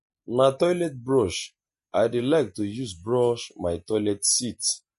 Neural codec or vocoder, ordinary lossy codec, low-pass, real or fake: none; MP3, 48 kbps; 14.4 kHz; real